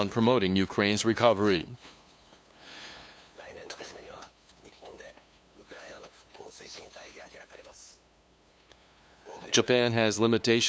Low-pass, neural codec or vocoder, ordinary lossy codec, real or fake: none; codec, 16 kHz, 2 kbps, FunCodec, trained on LibriTTS, 25 frames a second; none; fake